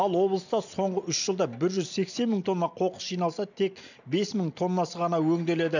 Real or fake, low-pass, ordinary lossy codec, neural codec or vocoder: real; 7.2 kHz; none; none